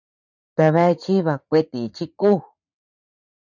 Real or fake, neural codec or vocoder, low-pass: fake; vocoder, 44.1 kHz, 80 mel bands, Vocos; 7.2 kHz